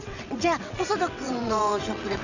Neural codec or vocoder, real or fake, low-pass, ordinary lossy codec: vocoder, 22.05 kHz, 80 mel bands, WaveNeXt; fake; 7.2 kHz; none